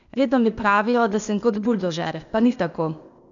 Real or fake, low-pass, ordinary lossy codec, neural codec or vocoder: fake; 7.2 kHz; none; codec, 16 kHz, 0.8 kbps, ZipCodec